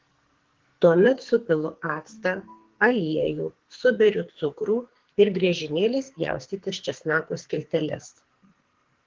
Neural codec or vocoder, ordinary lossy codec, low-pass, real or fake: codec, 44.1 kHz, 2.6 kbps, SNAC; Opus, 16 kbps; 7.2 kHz; fake